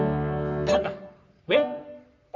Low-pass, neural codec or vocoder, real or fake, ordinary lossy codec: 7.2 kHz; codec, 44.1 kHz, 3.4 kbps, Pupu-Codec; fake; none